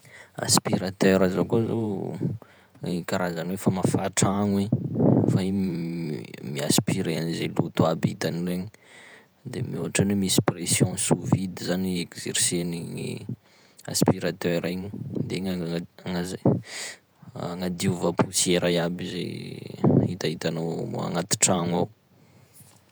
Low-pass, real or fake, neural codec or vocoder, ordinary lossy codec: none; fake; vocoder, 48 kHz, 128 mel bands, Vocos; none